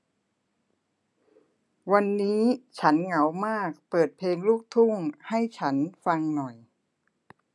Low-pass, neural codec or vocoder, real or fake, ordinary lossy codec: none; none; real; none